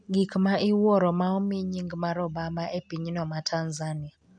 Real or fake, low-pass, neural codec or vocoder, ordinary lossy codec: real; 9.9 kHz; none; none